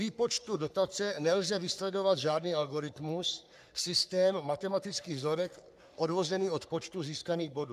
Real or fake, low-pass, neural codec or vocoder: fake; 14.4 kHz; codec, 44.1 kHz, 3.4 kbps, Pupu-Codec